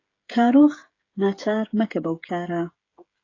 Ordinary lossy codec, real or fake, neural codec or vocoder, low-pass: AAC, 32 kbps; fake; codec, 16 kHz, 16 kbps, FreqCodec, smaller model; 7.2 kHz